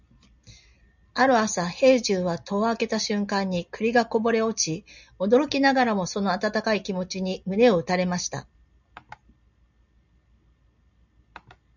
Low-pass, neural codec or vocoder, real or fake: 7.2 kHz; none; real